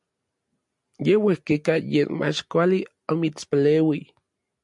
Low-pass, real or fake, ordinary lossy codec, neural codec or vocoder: 10.8 kHz; real; MP3, 64 kbps; none